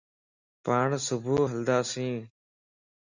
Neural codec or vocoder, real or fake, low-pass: none; real; 7.2 kHz